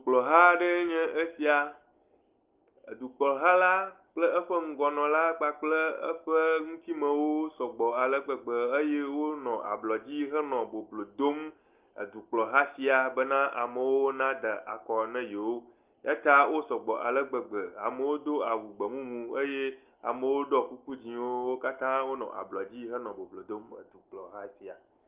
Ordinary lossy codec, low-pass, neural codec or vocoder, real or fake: Opus, 32 kbps; 3.6 kHz; none; real